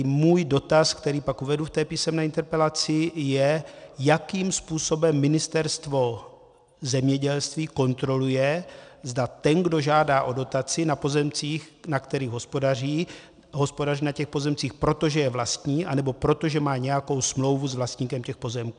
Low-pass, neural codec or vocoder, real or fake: 9.9 kHz; none; real